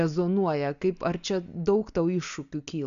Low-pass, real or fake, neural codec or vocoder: 7.2 kHz; real; none